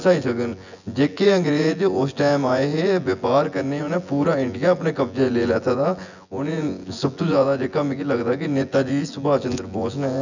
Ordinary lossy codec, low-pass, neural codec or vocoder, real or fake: none; 7.2 kHz; vocoder, 24 kHz, 100 mel bands, Vocos; fake